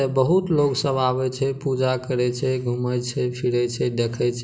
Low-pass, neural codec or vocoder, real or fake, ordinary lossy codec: none; none; real; none